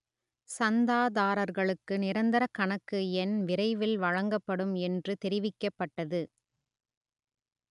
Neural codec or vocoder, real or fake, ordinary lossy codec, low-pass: none; real; none; 10.8 kHz